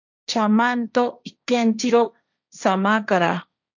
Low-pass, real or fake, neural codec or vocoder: 7.2 kHz; fake; codec, 16 kHz, 1.1 kbps, Voila-Tokenizer